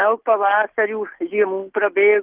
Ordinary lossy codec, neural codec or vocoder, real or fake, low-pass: Opus, 16 kbps; none; real; 3.6 kHz